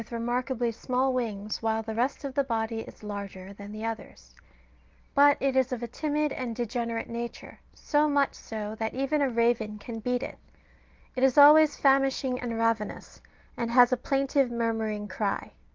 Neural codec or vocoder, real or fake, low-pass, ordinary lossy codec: none; real; 7.2 kHz; Opus, 32 kbps